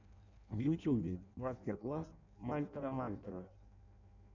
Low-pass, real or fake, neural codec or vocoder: 7.2 kHz; fake; codec, 16 kHz in and 24 kHz out, 0.6 kbps, FireRedTTS-2 codec